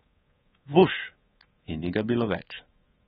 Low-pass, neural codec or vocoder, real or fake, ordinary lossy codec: 10.8 kHz; codec, 24 kHz, 3.1 kbps, DualCodec; fake; AAC, 16 kbps